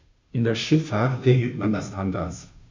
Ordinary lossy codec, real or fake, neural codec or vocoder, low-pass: MP3, 64 kbps; fake; codec, 16 kHz, 0.5 kbps, FunCodec, trained on Chinese and English, 25 frames a second; 7.2 kHz